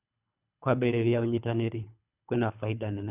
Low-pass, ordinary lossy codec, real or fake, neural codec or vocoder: 3.6 kHz; none; fake; codec, 24 kHz, 3 kbps, HILCodec